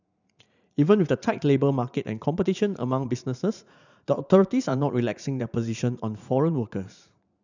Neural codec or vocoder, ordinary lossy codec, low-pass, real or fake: vocoder, 44.1 kHz, 80 mel bands, Vocos; none; 7.2 kHz; fake